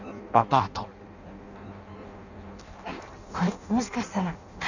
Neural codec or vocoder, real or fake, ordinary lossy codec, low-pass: codec, 16 kHz in and 24 kHz out, 0.6 kbps, FireRedTTS-2 codec; fake; none; 7.2 kHz